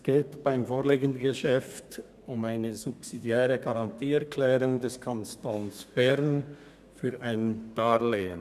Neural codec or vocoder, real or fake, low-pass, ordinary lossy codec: codec, 32 kHz, 1.9 kbps, SNAC; fake; 14.4 kHz; none